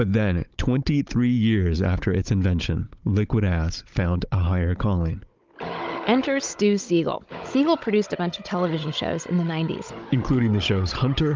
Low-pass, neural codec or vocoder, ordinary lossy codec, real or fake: 7.2 kHz; codec, 16 kHz, 16 kbps, FunCodec, trained on Chinese and English, 50 frames a second; Opus, 24 kbps; fake